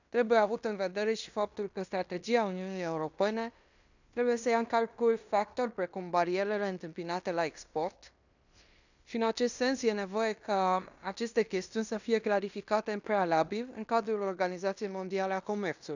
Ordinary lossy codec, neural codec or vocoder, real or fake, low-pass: none; codec, 16 kHz in and 24 kHz out, 0.9 kbps, LongCat-Audio-Codec, fine tuned four codebook decoder; fake; 7.2 kHz